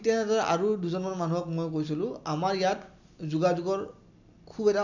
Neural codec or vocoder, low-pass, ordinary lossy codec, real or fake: none; 7.2 kHz; none; real